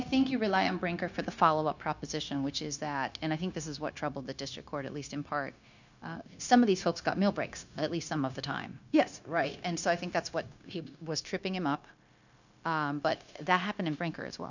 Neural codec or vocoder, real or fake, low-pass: codec, 16 kHz, 0.9 kbps, LongCat-Audio-Codec; fake; 7.2 kHz